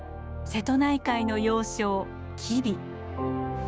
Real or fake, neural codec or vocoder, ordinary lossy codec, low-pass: fake; codec, 16 kHz, 6 kbps, DAC; none; none